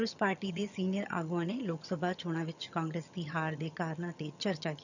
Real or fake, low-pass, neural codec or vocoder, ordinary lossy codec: fake; 7.2 kHz; vocoder, 22.05 kHz, 80 mel bands, HiFi-GAN; none